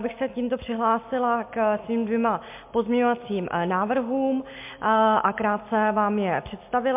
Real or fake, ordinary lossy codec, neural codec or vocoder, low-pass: real; MP3, 32 kbps; none; 3.6 kHz